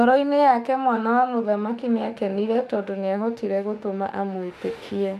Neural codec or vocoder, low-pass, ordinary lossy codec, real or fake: autoencoder, 48 kHz, 32 numbers a frame, DAC-VAE, trained on Japanese speech; 14.4 kHz; none; fake